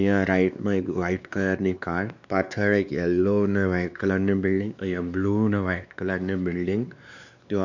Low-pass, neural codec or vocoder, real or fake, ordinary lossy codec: 7.2 kHz; codec, 16 kHz, 2 kbps, X-Codec, WavLM features, trained on Multilingual LibriSpeech; fake; none